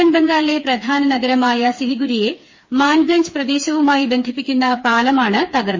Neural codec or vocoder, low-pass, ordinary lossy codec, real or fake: codec, 16 kHz, 4 kbps, FreqCodec, smaller model; 7.2 kHz; MP3, 32 kbps; fake